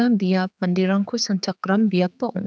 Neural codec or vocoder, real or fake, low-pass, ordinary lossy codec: codec, 16 kHz, 4 kbps, X-Codec, HuBERT features, trained on general audio; fake; none; none